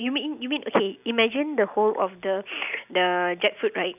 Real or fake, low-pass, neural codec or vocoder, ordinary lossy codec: real; 3.6 kHz; none; none